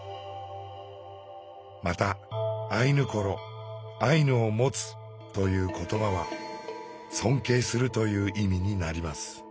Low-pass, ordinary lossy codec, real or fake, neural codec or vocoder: none; none; real; none